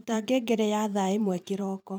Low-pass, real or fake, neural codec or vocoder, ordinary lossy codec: none; fake; vocoder, 44.1 kHz, 128 mel bands every 512 samples, BigVGAN v2; none